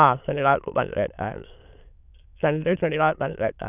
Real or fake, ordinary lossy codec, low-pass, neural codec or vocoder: fake; none; 3.6 kHz; autoencoder, 22.05 kHz, a latent of 192 numbers a frame, VITS, trained on many speakers